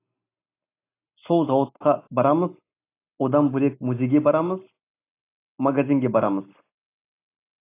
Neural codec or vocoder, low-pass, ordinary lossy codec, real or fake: none; 3.6 kHz; AAC, 24 kbps; real